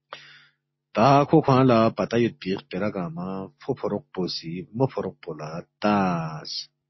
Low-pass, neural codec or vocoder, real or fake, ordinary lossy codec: 7.2 kHz; none; real; MP3, 24 kbps